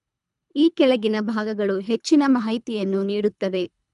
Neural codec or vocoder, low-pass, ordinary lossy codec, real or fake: codec, 24 kHz, 3 kbps, HILCodec; 10.8 kHz; none; fake